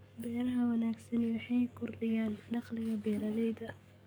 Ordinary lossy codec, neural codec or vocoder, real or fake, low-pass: none; codec, 44.1 kHz, 7.8 kbps, DAC; fake; none